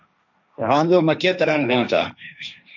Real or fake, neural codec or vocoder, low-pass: fake; codec, 16 kHz, 1.1 kbps, Voila-Tokenizer; 7.2 kHz